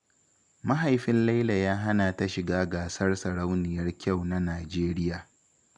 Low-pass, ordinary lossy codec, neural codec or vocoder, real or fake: 10.8 kHz; none; none; real